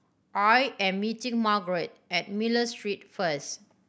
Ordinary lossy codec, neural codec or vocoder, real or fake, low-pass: none; none; real; none